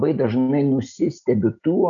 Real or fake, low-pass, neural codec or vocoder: real; 7.2 kHz; none